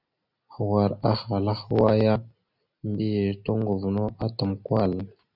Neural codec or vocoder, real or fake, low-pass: none; real; 5.4 kHz